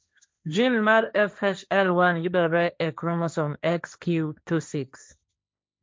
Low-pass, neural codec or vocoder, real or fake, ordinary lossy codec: none; codec, 16 kHz, 1.1 kbps, Voila-Tokenizer; fake; none